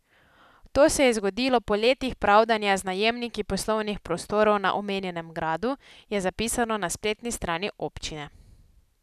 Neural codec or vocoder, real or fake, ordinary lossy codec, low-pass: none; real; none; 14.4 kHz